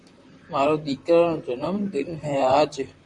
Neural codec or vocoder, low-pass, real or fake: vocoder, 44.1 kHz, 128 mel bands, Pupu-Vocoder; 10.8 kHz; fake